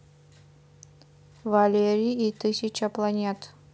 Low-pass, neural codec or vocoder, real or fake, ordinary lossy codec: none; none; real; none